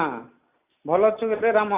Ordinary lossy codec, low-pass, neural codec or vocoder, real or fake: Opus, 64 kbps; 3.6 kHz; none; real